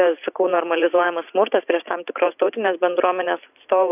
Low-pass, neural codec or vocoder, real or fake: 3.6 kHz; vocoder, 44.1 kHz, 128 mel bands every 512 samples, BigVGAN v2; fake